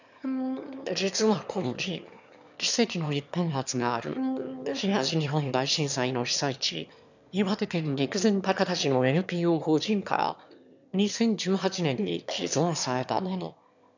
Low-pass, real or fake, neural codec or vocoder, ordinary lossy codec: 7.2 kHz; fake; autoencoder, 22.05 kHz, a latent of 192 numbers a frame, VITS, trained on one speaker; none